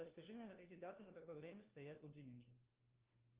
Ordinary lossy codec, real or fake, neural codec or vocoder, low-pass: Opus, 32 kbps; fake; codec, 16 kHz, 1 kbps, FunCodec, trained on LibriTTS, 50 frames a second; 3.6 kHz